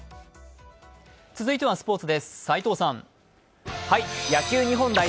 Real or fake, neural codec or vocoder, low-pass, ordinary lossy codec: real; none; none; none